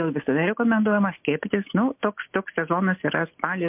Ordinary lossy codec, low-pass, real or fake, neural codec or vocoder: MP3, 32 kbps; 3.6 kHz; real; none